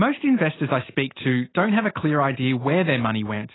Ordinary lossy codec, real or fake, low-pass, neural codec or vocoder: AAC, 16 kbps; real; 7.2 kHz; none